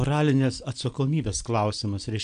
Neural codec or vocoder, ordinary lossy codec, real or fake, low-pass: none; AAC, 96 kbps; real; 9.9 kHz